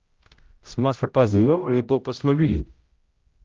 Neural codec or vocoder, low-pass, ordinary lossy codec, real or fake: codec, 16 kHz, 0.5 kbps, X-Codec, HuBERT features, trained on general audio; 7.2 kHz; Opus, 32 kbps; fake